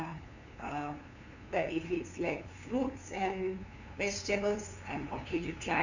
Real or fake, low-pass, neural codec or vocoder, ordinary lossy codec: fake; 7.2 kHz; codec, 16 kHz, 2 kbps, FunCodec, trained on LibriTTS, 25 frames a second; none